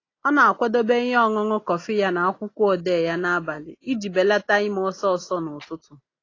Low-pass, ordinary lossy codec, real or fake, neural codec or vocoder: 7.2 kHz; AAC, 32 kbps; real; none